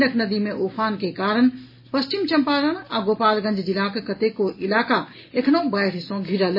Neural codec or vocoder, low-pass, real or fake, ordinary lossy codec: none; 5.4 kHz; real; MP3, 24 kbps